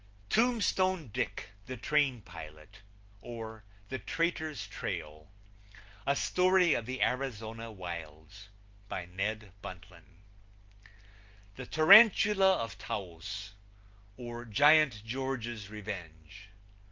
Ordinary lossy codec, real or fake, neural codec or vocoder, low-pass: Opus, 24 kbps; real; none; 7.2 kHz